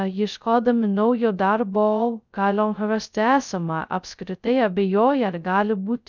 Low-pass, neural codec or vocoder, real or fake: 7.2 kHz; codec, 16 kHz, 0.2 kbps, FocalCodec; fake